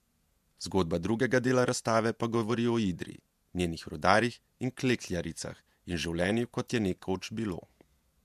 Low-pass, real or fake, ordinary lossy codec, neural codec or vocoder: 14.4 kHz; fake; MP3, 96 kbps; vocoder, 48 kHz, 128 mel bands, Vocos